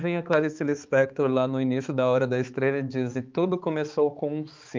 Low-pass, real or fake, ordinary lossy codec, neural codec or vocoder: 7.2 kHz; fake; Opus, 32 kbps; codec, 16 kHz, 4 kbps, X-Codec, HuBERT features, trained on balanced general audio